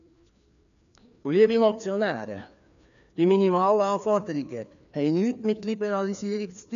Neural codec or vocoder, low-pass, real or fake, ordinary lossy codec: codec, 16 kHz, 2 kbps, FreqCodec, larger model; 7.2 kHz; fake; none